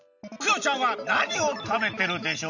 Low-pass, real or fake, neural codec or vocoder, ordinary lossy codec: 7.2 kHz; real; none; none